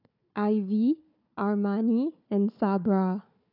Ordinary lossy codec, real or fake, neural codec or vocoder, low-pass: none; fake; codec, 16 kHz, 4 kbps, FunCodec, trained on Chinese and English, 50 frames a second; 5.4 kHz